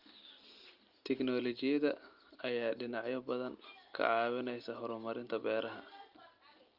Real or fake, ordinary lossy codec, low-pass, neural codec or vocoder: real; Opus, 24 kbps; 5.4 kHz; none